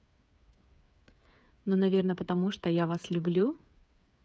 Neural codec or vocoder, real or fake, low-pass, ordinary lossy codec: codec, 16 kHz, 8 kbps, FreqCodec, smaller model; fake; none; none